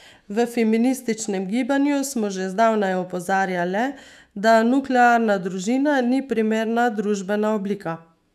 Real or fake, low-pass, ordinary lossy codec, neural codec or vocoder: fake; 14.4 kHz; none; autoencoder, 48 kHz, 128 numbers a frame, DAC-VAE, trained on Japanese speech